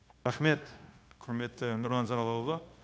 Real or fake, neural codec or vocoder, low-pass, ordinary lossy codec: fake; codec, 16 kHz, 0.9 kbps, LongCat-Audio-Codec; none; none